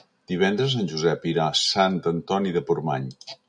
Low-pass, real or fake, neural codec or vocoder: 9.9 kHz; real; none